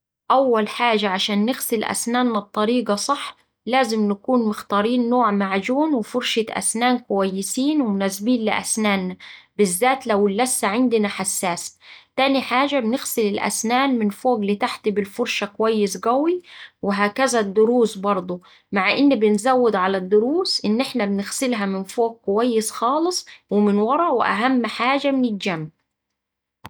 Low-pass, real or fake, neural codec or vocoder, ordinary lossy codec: none; real; none; none